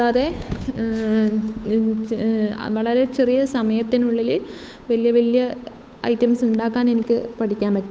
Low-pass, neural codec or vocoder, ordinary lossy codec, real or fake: none; codec, 16 kHz, 8 kbps, FunCodec, trained on Chinese and English, 25 frames a second; none; fake